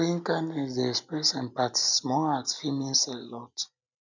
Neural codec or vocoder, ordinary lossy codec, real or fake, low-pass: none; none; real; 7.2 kHz